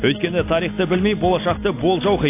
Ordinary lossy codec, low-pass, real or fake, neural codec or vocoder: AAC, 24 kbps; 3.6 kHz; real; none